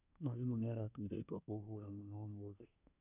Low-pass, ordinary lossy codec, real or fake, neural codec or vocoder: 3.6 kHz; none; fake; codec, 24 kHz, 1 kbps, SNAC